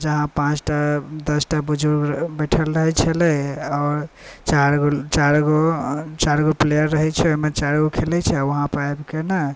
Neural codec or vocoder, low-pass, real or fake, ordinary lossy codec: none; none; real; none